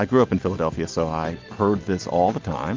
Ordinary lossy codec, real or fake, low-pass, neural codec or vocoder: Opus, 24 kbps; real; 7.2 kHz; none